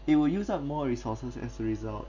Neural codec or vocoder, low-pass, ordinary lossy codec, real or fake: none; 7.2 kHz; none; real